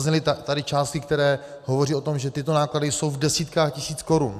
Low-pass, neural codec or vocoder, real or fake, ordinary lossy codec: 14.4 kHz; none; real; AAC, 96 kbps